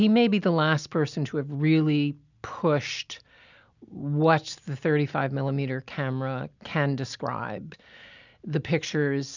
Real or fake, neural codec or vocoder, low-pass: real; none; 7.2 kHz